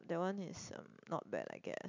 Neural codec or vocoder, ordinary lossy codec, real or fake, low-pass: none; MP3, 64 kbps; real; 7.2 kHz